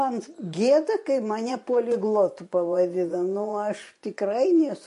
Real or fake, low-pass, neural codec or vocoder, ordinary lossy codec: fake; 10.8 kHz; vocoder, 24 kHz, 100 mel bands, Vocos; MP3, 48 kbps